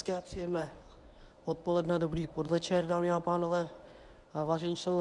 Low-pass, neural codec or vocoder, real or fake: 10.8 kHz; codec, 24 kHz, 0.9 kbps, WavTokenizer, medium speech release version 1; fake